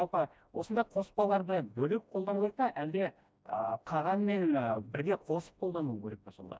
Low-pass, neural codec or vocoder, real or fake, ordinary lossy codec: none; codec, 16 kHz, 1 kbps, FreqCodec, smaller model; fake; none